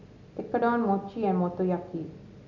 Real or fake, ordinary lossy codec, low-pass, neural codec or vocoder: real; none; 7.2 kHz; none